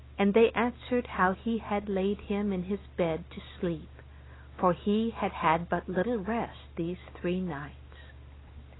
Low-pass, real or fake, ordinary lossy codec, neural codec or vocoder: 7.2 kHz; real; AAC, 16 kbps; none